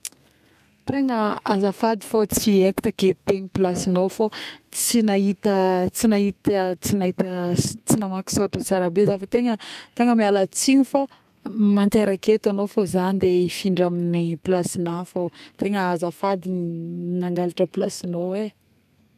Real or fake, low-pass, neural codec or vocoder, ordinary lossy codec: fake; 14.4 kHz; codec, 32 kHz, 1.9 kbps, SNAC; none